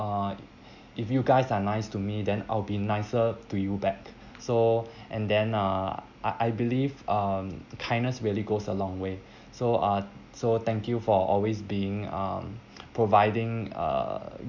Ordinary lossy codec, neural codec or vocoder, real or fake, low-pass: none; none; real; 7.2 kHz